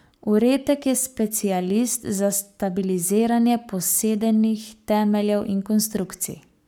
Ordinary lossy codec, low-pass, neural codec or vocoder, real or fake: none; none; codec, 44.1 kHz, 7.8 kbps, Pupu-Codec; fake